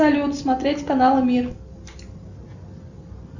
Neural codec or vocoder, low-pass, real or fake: none; 7.2 kHz; real